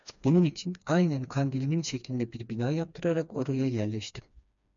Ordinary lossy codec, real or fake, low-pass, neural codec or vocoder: MP3, 96 kbps; fake; 7.2 kHz; codec, 16 kHz, 2 kbps, FreqCodec, smaller model